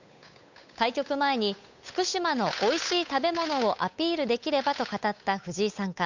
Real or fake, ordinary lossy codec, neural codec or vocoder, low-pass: fake; none; codec, 16 kHz, 8 kbps, FunCodec, trained on Chinese and English, 25 frames a second; 7.2 kHz